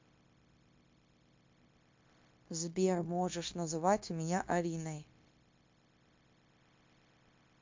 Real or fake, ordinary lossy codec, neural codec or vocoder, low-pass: fake; MP3, 48 kbps; codec, 16 kHz, 0.9 kbps, LongCat-Audio-Codec; 7.2 kHz